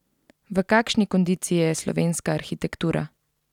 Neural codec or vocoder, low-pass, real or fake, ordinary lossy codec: none; 19.8 kHz; real; none